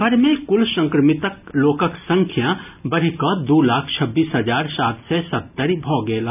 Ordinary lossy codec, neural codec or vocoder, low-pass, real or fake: none; none; 3.6 kHz; real